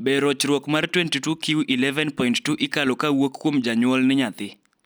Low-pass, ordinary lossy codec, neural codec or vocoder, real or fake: none; none; none; real